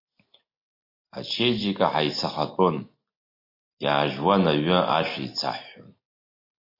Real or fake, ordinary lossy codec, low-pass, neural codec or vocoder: real; MP3, 32 kbps; 5.4 kHz; none